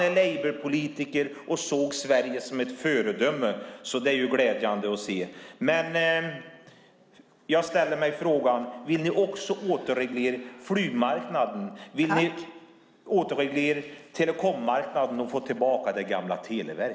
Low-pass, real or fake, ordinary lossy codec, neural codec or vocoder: none; real; none; none